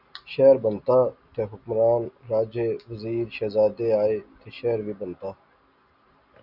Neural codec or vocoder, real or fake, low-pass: none; real; 5.4 kHz